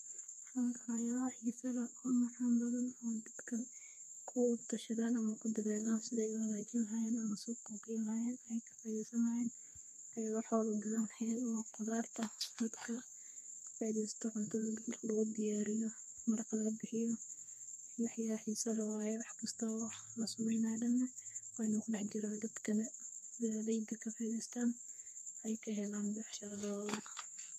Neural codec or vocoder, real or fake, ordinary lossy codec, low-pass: codec, 32 kHz, 1.9 kbps, SNAC; fake; MP3, 64 kbps; 14.4 kHz